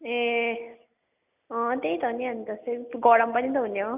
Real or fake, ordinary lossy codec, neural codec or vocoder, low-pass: real; none; none; 3.6 kHz